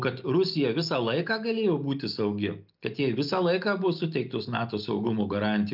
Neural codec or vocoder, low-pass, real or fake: none; 5.4 kHz; real